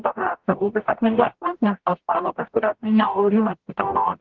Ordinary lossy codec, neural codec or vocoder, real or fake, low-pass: Opus, 16 kbps; codec, 44.1 kHz, 0.9 kbps, DAC; fake; 7.2 kHz